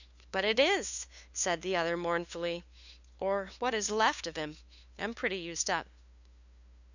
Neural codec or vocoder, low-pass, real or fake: codec, 16 kHz, 2 kbps, FunCodec, trained on LibriTTS, 25 frames a second; 7.2 kHz; fake